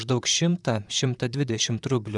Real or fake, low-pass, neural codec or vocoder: fake; 10.8 kHz; vocoder, 44.1 kHz, 128 mel bands, Pupu-Vocoder